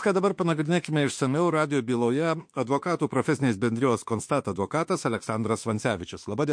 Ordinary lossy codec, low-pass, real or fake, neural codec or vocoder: MP3, 48 kbps; 9.9 kHz; fake; autoencoder, 48 kHz, 32 numbers a frame, DAC-VAE, trained on Japanese speech